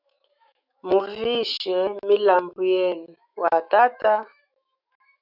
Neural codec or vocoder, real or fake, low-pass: autoencoder, 48 kHz, 128 numbers a frame, DAC-VAE, trained on Japanese speech; fake; 5.4 kHz